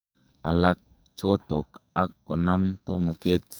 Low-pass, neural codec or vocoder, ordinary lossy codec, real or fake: none; codec, 44.1 kHz, 2.6 kbps, SNAC; none; fake